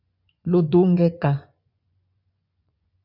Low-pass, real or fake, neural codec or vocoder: 5.4 kHz; fake; vocoder, 24 kHz, 100 mel bands, Vocos